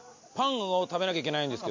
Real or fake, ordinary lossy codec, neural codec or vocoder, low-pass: real; MP3, 48 kbps; none; 7.2 kHz